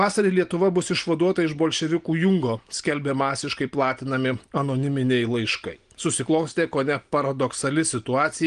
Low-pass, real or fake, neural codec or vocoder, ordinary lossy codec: 9.9 kHz; real; none; Opus, 24 kbps